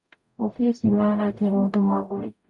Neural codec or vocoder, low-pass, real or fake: codec, 44.1 kHz, 0.9 kbps, DAC; 10.8 kHz; fake